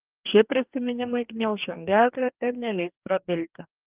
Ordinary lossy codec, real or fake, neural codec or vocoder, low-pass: Opus, 32 kbps; fake; codec, 44.1 kHz, 2.6 kbps, DAC; 3.6 kHz